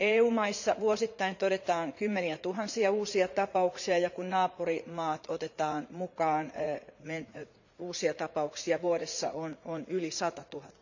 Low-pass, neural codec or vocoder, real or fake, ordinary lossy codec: 7.2 kHz; vocoder, 44.1 kHz, 80 mel bands, Vocos; fake; none